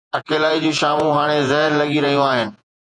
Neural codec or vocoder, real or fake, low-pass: vocoder, 48 kHz, 128 mel bands, Vocos; fake; 9.9 kHz